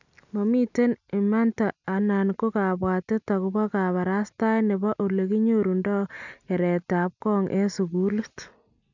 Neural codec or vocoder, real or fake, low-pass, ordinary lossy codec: none; real; 7.2 kHz; none